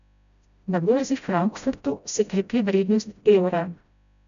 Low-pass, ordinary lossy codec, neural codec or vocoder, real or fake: 7.2 kHz; none; codec, 16 kHz, 0.5 kbps, FreqCodec, smaller model; fake